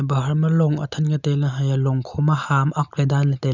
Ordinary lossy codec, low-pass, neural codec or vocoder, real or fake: none; 7.2 kHz; none; real